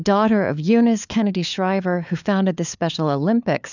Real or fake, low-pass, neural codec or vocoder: fake; 7.2 kHz; codec, 16 kHz, 4 kbps, FunCodec, trained on LibriTTS, 50 frames a second